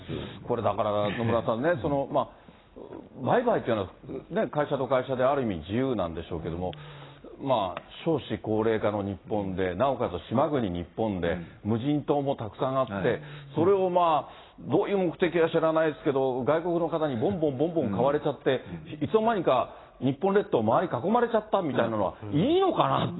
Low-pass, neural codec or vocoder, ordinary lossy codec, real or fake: 7.2 kHz; none; AAC, 16 kbps; real